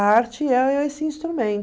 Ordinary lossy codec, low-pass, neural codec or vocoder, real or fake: none; none; none; real